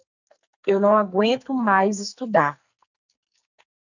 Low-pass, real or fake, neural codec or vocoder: 7.2 kHz; fake; codec, 44.1 kHz, 2.6 kbps, SNAC